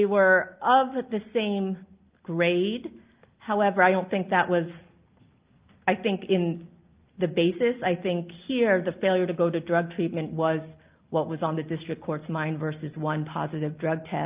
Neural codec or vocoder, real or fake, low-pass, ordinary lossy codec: none; real; 3.6 kHz; Opus, 32 kbps